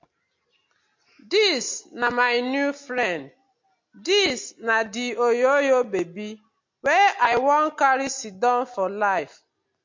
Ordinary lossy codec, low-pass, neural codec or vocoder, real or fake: MP3, 48 kbps; 7.2 kHz; none; real